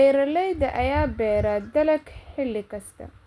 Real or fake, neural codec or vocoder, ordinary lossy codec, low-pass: real; none; none; none